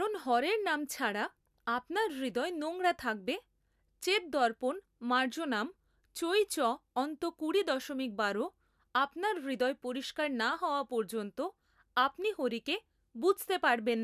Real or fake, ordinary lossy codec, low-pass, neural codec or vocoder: real; none; 14.4 kHz; none